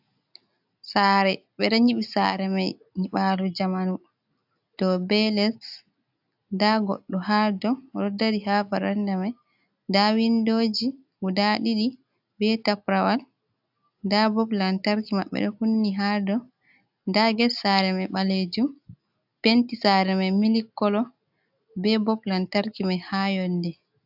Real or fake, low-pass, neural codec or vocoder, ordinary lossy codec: real; 5.4 kHz; none; AAC, 48 kbps